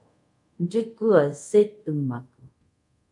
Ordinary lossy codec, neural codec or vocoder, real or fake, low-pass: MP3, 64 kbps; codec, 24 kHz, 0.5 kbps, DualCodec; fake; 10.8 kHz